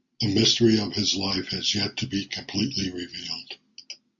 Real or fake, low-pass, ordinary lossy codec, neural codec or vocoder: real; 7.2 kHz; MP3, 48 kbps; none